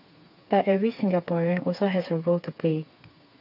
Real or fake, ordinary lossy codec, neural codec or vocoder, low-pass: fake; none; codec, 16 kHz, 4 kbps, FreqCodec, smaller model; 5.4 kHz